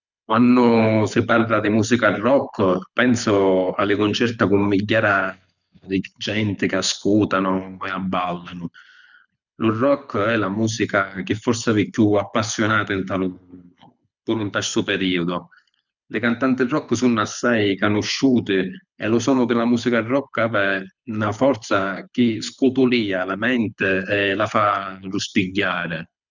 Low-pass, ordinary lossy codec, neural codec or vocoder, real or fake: 7.2 kHz; none; codec, 24 kHz, 6 kbps, HILCodec; fake